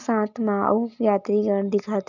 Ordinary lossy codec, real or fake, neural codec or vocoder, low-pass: none; real; none; 7.2 kHz